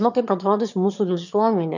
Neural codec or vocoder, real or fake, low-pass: autoencoder, 22.05 kHz, a latent of 192 numbers a frame, VITS, trained on one speaker; fake; 7.2 kHz